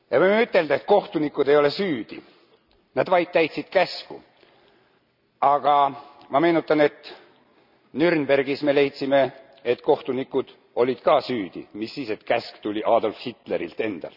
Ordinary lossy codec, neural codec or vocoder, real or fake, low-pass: none; none; real; 5.4 kHz